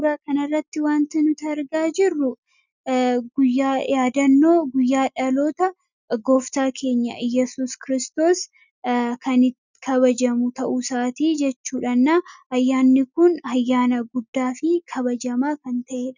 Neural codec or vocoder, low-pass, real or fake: none; 7.2 kHz; real